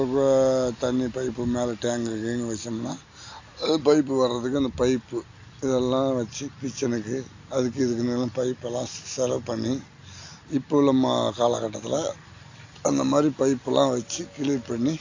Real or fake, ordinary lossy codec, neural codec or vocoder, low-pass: real; AAC, 48 kbps; none; 7.2 kHz